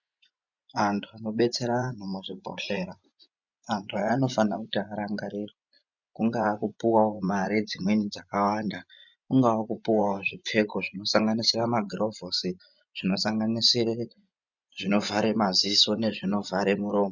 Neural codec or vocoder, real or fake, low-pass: vocoder, 24 kHz, 100 mel bands, Vocos; fake; 7.2 kHz